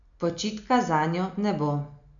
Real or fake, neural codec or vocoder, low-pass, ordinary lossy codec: real; none; 7.2 kHz; none